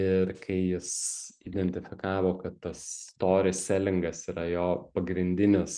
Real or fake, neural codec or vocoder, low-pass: real; none; 9.9 kHz